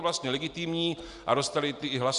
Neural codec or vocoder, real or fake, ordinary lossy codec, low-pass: none; real; Opus, 64 kbps; 10.8 kHz